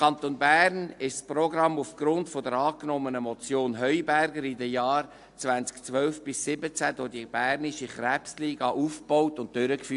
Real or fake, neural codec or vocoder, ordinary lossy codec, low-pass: real; none; AAC, 64 kbps; 10.8 kHz